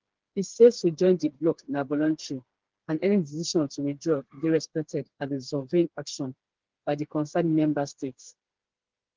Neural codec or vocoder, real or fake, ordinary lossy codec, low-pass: codec, 16 kHz, 4 kbps, FreqCodec, smaller model; fake; Opus, 16 kbps; 7.2 kHz